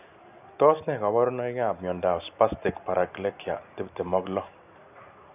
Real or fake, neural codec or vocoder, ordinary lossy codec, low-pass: real; none; none; 3.6 kHz